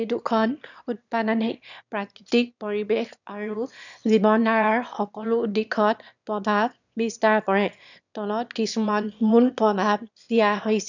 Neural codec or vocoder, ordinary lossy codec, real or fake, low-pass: autoencoder, 22.05 kHz, a latent of 192 numbers a frame, VITS, trained on one speaker; none; fake; 7.2 kHz